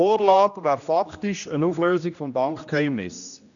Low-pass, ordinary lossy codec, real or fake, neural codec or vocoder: 7.2 kHz; none; fake; codec, 16 kHz, 1 kbps, X-Codec, HuBERT features, trained on general audio